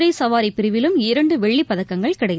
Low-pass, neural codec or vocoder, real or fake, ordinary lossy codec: none; none; real; none